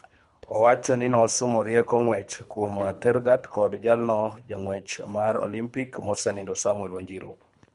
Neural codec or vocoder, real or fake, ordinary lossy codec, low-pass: codec, 24 kHz, 3 kbps, HILCodec; fake; MP3, 64 kbps; 10.8 kHz